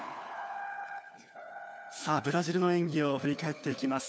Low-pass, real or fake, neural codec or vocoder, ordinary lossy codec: none; fake; codec, 16 kHz, 4 kbps, FunCodec, trained on LibriTTS, 50 frames a second; none